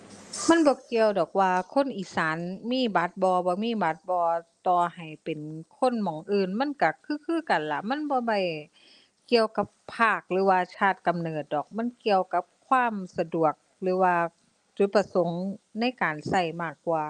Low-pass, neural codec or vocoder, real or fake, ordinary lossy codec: 10.8 kHz; none; real; Opus, 32 kbps